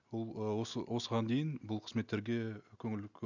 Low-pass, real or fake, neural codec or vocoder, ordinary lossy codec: 7.2 kHz; real; none; none